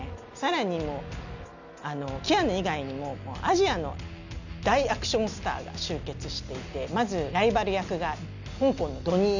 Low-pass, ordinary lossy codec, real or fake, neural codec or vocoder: 7.2 kHz; none; real; none